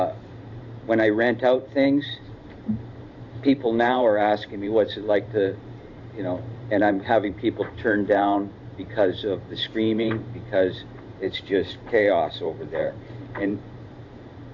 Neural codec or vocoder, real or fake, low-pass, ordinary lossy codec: vocoder, 44.1 kHz, 128 mel bands every 512 samples, BigVGAN v2; fake; 7.2 kHz; AAC, 48 kbps